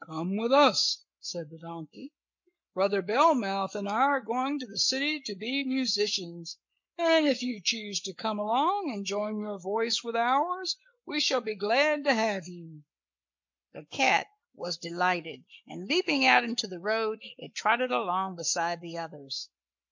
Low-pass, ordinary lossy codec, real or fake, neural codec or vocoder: 7.2 kHz; MP3, 48 kbps; fake; codec, 44.1 kHz, 7.8 kbps, Pupu-Codec